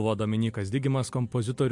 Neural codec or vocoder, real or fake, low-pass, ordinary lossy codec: none; real; 10.8 kHz; MP3, 64 kbps